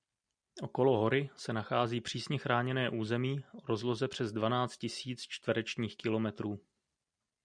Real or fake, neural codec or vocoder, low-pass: real; none; 9.9 kHz